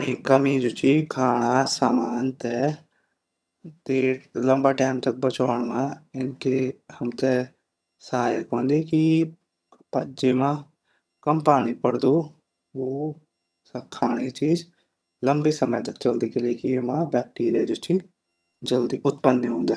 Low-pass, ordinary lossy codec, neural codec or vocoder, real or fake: none; none; vocoder, 22.05 kHz, 80 mel bands, HiFi-GAN; fake